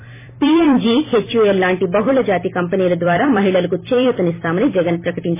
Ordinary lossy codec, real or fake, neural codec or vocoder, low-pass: MP3, 16 kbps; fake; vocoder, 44.1 kHz, 128 mel bands every 256 samples, BigVGAN v2; 3.6 kHz